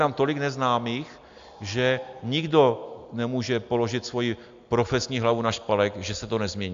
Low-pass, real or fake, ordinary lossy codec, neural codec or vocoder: 7.2 kHz; real; AAC, 64 kbps; none